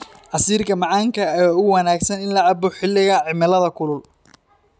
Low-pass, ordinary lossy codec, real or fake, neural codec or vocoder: none; none; real; none